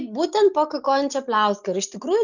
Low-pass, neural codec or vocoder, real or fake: 7.2 kHz; none; real